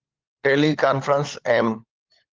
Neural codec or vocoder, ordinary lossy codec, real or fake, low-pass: codec, 16 kHz, 16 kbps, FunCodec, trained on LibriTTS, 50 frames a second; Opus, 16 kbps; fake; 7.2 kHz